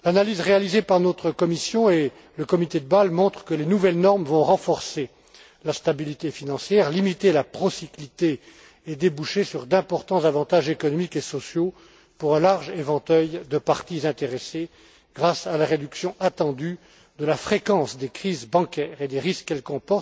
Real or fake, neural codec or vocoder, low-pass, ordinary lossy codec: real; none; none; none